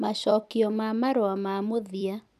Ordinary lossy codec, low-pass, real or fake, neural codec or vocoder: none; 14.4 kHz; fake; vocoder, 44.1 kHz, 128 mel bands every 256 samples, BigVGAN v2